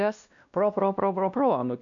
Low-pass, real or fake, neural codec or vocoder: 7.2 kHz; fake; codec, 16 kHz, 1 kbps, X-Codec, WavLM features, trained on Multilingual LibriSpeech